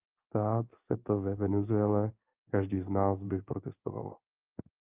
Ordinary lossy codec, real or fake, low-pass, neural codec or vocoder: Opus, 32 kbps; fake; 3.6 kHz; codec, 16 kHz in and 24 kHz out, 1 kbps, XY-Tokenizer